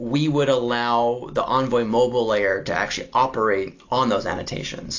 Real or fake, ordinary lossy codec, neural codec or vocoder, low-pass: real; AAC, 48 kbps; none; 7.2 kHz